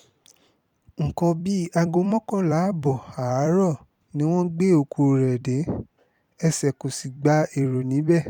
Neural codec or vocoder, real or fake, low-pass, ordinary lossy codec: vocoder, 44.1 kHz, 128 mel bands every 256 samples, BigVGAN v2; fake; 19.8 kHz; none